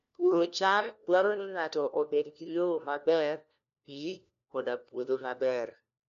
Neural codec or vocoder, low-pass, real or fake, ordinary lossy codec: codec, 16 kHz, 1 kbps, FunCodec, trained on LibriTTS, 50 frames a second; 7.2 kHz; fake; none